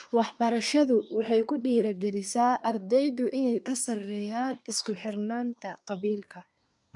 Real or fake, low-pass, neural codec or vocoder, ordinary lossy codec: fake; 10.8 kHz; codec, 24 kHz, 1 kbps, SNAC; MP3, 96 kbps